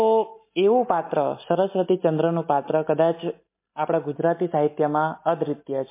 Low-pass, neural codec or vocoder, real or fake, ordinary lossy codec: 3.6 kHz; none; real; MP3, 24 kbps